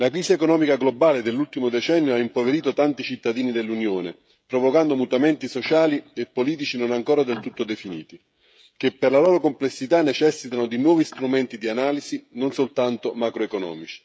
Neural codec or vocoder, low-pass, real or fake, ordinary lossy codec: codec, 16 kHz, 16 kbps, FreqCodec, smaller model; none; fake; none